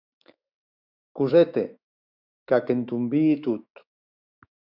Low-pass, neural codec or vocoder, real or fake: 5.4 kHz; autoencoder, 48 kHz, 128 numbers a frame, DAC-VAE, trained on Japanese speech; fake